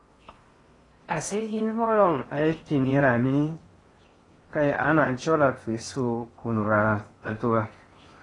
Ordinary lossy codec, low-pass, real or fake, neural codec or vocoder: AAC, 32 kbps; 10.8 kHz; fake; codec, 16 kHz in and 24 kHz out, 0.6 kbps, FocalCodec, streaming, 4096 codes